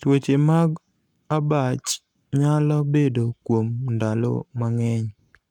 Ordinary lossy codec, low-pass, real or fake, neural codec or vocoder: none; 19.8 kHz; fake; codec, 44.1 kHz, 7.8 kbps, Pupu-Codec